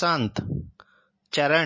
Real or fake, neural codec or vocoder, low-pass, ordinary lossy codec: real; none; 7.2 kHz; MP3, 32 kbps